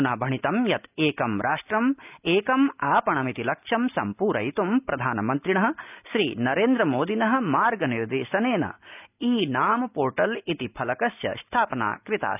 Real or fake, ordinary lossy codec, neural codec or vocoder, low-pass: real; none; none; 3.6 kHz